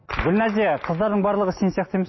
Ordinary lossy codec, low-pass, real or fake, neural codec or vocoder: MP3, 24 kbps; 7.2 kHz; real; none